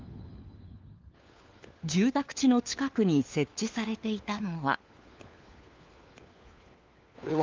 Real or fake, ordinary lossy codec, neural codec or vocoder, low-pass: fake; Opus, 24 kbps; codec, 16 kHz, 4 kbps, FunCodec, trained on LibriTTS, 50 frames a second; 7.2 kHz